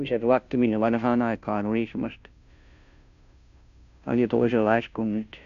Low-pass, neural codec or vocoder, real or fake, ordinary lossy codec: 7.2 kHz; codec, 16 kHz, 0.5 kbps, FunCodec, trained on Chinese and English, 25 frames a second; fake; none